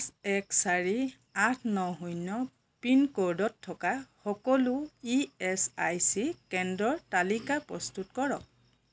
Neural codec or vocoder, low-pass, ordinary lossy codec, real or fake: none; none; none; real